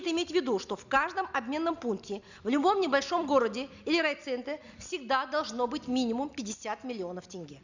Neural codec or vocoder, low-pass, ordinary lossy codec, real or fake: none; 7.2 kHz; none; real